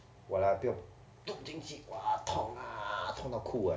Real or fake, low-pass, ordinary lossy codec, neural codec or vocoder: real; none; none; none